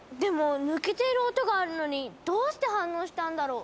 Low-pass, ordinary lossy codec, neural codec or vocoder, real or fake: none; none; none; real